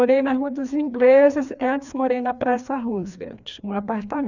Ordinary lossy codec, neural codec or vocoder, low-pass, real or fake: none; codec, 16 kHz, 2 kbps, FreqCodec, larger model; 7.2 kHz; fake